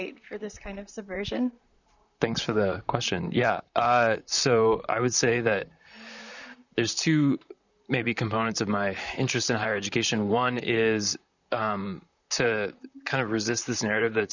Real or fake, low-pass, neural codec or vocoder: fake; 7.2 kHz; vocoder, 44.1 kHz, 128 mel bands, Pupu-Vocoder